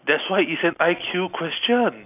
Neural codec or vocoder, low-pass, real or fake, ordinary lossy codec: none; 3.6 kHz; real; none